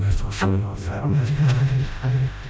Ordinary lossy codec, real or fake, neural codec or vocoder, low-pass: none; fake; codec, 16 kHz, 0.5 kbps, FreqCodec, smaller model; none